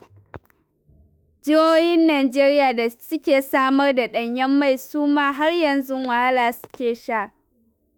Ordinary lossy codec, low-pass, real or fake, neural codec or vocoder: none; none; fake; autoencoder, 48 kHz, 32 numbers a frame, DAC-VAE, trained on Japanese speech